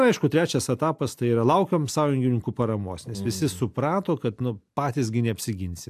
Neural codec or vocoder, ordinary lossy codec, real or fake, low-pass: none; AAC, 96 kbps; real; 14.4 kHz